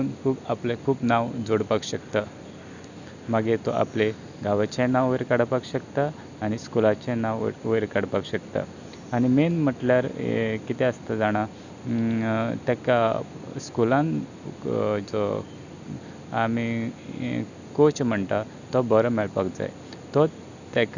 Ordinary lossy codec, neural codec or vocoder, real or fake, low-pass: none; none; real; 7.2 kHz